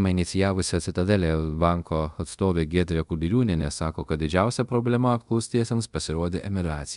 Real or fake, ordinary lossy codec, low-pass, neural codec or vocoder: fake; MP3, 96 kbps; 10.8 kHz; codec, 24 kHz, 0.5 kbps, DualCodec